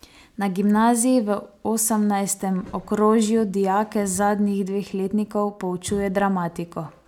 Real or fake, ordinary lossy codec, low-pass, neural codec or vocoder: real; none; 19.8 kHz; none